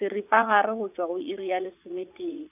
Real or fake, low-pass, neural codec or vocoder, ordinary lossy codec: fake; 3.6 kHz; vocoder, 44.1 kHz, 80 mel bands, Vocos; none